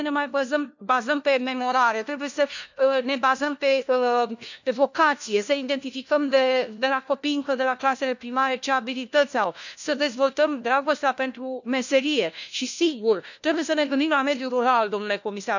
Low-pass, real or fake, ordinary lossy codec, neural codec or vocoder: 7.2 kHz; fake; none; codec, 16 kHz, 1 kbps, FunCodec, trained on LibriTTS, 50 frames a second